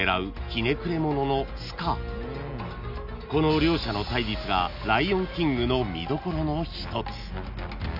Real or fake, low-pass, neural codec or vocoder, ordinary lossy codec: real; 5.4 kHz; none; none